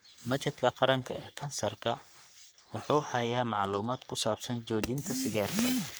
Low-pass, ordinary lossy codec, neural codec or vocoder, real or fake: none; none; codec, 44.1 kHz, 3.4 kbps, Pupu-Codec; fake